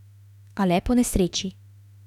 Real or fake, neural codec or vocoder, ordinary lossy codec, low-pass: fake; autoencoder, 48 kHz, 32 numbers a frame, DAC-VAE, trained on Japanese speech; none; 19.8 kHz